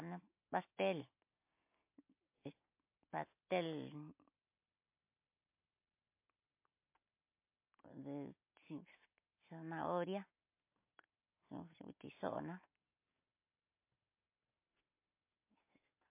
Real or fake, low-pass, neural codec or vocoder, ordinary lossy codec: real; 3.6 kHz; none; MP3, 24 kbps